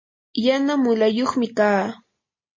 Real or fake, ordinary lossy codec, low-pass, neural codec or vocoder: real; MP3, 32 kbps; 7.2 kHz; none